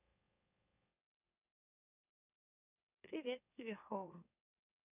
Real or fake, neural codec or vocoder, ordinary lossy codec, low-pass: fake; autoencoder, 44.1 kHz, a latent of 192 numbers a frame, MeloTTS; none; 3.6 kHz